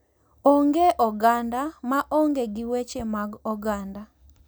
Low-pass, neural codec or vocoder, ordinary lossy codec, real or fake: none; none; none; real